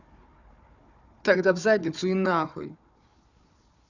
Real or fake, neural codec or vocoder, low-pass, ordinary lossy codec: fake; codec, 16 kHz, 4 kbps, FunCodec, trained on Chinese and English, 50 frames a second; 7.2 kHz; none